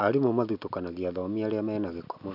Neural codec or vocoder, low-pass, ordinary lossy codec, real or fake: none; 5.4 kHz; none; real